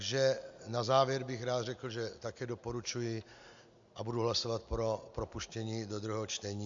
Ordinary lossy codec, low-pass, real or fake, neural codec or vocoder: MP3, 64 kbps; 7.2 kHz; real; none